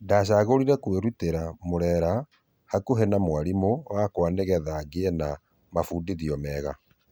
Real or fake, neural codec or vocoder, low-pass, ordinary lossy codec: real; none; none; none